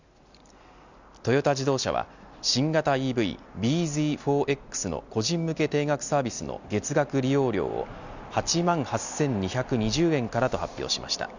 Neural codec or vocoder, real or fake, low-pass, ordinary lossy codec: none; real; 7.2 kHz; none